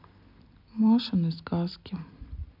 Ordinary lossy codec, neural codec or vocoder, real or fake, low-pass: MP3, 48 kbps; none; real; 5.4 kHz